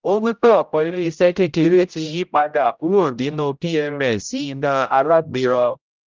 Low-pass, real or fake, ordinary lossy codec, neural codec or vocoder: 7.2 kHz; fake; Opus, 24 kbps; codec, 16 kHz, 0.5 kbps, X-Codec, HuBERT features, trained on general audio